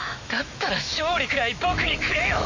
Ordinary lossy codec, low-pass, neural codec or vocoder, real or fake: MP3, 48 kbps; 7.2 kHz; autoencoder, 48 kHz, 128 numbers a frame, DAC-VAE, trained on Japanese speech; fake